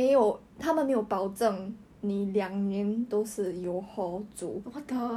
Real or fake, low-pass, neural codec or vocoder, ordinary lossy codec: real; 19.8 kHz; none; none